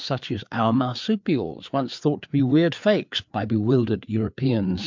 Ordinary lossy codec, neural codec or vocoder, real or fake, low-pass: MP3, 64 kbps; codec, 16 kHz, 4 kbps, FreqCodec, larger model; fake; 7.2 kHz